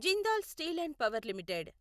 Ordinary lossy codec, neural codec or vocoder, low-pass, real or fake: Opus, 64 kbps; none; 14.4 kHz; real